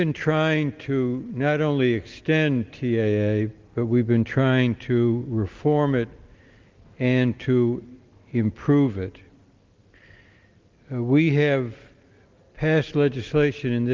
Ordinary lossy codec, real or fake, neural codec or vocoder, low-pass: Opus, 32 kbps; real; none; 7.2 kHz